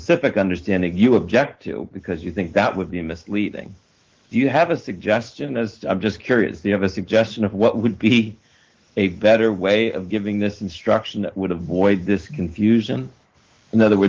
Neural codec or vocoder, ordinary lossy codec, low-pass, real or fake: autoencoder, 48 kHz, 128 numbers a frame, DAC-VAE, trained on Japanese speech; Opus, 16 kbps; 7.2 kHz; fake